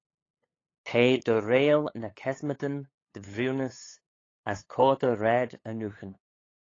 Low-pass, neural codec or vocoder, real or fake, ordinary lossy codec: 7.2 kHz; codec, 16 kHz, 8 kbps, FunCodec, trained on LibriTTS, 25 frames a second; fake; AAC, 32 kbps